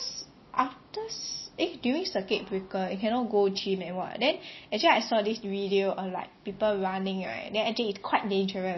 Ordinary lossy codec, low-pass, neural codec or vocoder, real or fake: MP3, 24 kbps; 7.2 kHz; none; real